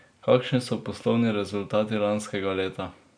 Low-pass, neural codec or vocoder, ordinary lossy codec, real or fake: 9.9 kHz; none; none; real